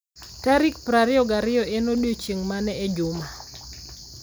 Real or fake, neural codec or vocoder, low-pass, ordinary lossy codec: real; none; none; none